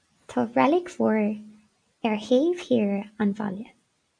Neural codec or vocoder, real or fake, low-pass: none; real; 9.9 kHz